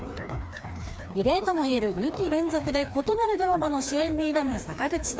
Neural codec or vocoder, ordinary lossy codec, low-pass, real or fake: codec, 16 kHz, 2 kbps, FreqCodec, larger model; none; none; fake